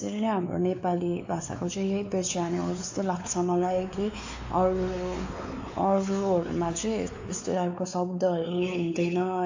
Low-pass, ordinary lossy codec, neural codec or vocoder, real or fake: 7.2 kHz; AAC, 48 kbps; codec, 16 kHz, 4 kbps, X-Codec, WavLM features, trained on Multilingual LibriSpeech; fake